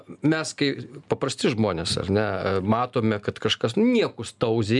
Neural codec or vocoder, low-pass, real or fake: none; 10.8 kHz; real